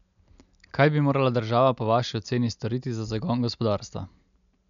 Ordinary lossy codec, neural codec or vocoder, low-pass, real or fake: none; none; 7.2 kHz; real